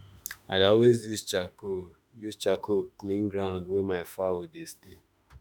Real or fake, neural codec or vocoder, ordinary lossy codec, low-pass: fake; autoencoder, 48 kHz, 32 numbers a frame, DAC-VAE, trained on Japanese speech; none; none